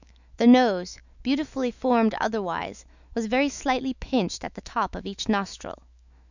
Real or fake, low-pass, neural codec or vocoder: fake; 7.2 kHz; autoencoder, 48 kHz, 128 numbers a frame, DAC-VAE, trained on Japanese speech